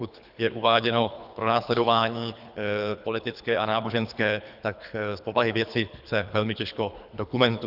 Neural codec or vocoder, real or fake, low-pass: codec, 24 kHz, 3 kbps, HILCodec; fake; 5.4 kHz